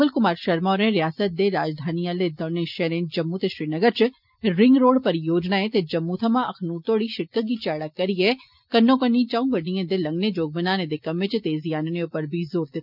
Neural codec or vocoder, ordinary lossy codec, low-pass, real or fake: none; none; 5.4 kHz; real